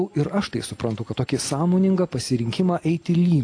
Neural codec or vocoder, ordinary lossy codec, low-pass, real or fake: none; AAC, 48 kbps; 9.9 kHz; real